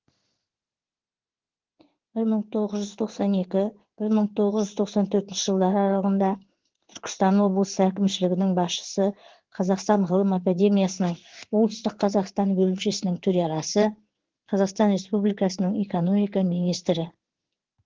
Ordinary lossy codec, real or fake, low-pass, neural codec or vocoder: Opus, 16 kbps; fake; 7.2 kHz; codec, 16 kHz in and 24 kHz out, 1 kbps, XY-Tokenizer